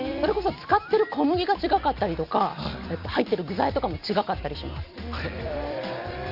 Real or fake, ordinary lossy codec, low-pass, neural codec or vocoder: fake; none; 5.4 kHz; vocoder, 44.1 kHz, 128 mel bands every 256 samples, BigVGAN v2